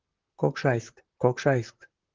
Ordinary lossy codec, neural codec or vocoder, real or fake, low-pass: Opus, 16 kbps; vocoder, 44.1 kHz, 128 mel bands, Pupu-Vocoder; fake; 7.2 kHz